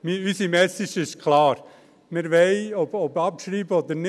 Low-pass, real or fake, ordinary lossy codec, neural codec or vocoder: none; real; none; none